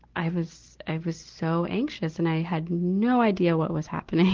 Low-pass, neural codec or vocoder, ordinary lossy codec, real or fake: 7.2 kHz; none; Opus, 16 kbps; real